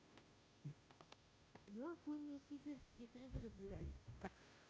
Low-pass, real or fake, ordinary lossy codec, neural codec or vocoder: none; fake; none; codec, 16 kHz, 0.5 kbps, FunCodec, trained on Chinese and English, 25 frames a second